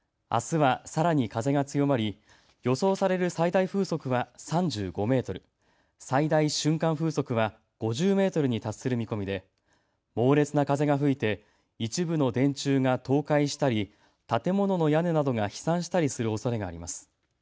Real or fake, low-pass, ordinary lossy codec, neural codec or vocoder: real; none; none; none